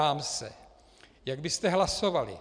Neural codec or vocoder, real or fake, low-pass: vocoder, 44.1 kHz, 128 mel bands every 256 samples, BigVGAN v2; fake; 9.9 kHz